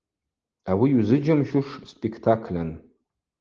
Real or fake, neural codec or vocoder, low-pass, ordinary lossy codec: real; none; 7.2 kHz; Opus, 16 kbps